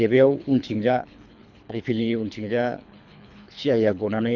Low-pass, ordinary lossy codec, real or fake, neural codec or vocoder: 7.2 kHz; none; fake; codec, 24 kHz, 3 kbps, HILCodec